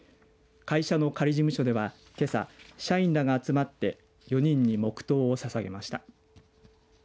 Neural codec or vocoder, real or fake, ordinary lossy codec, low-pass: none; real; none; none